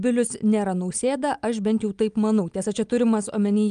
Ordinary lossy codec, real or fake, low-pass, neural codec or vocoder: Opus, 32 kbps; real; 9.9 kHz; none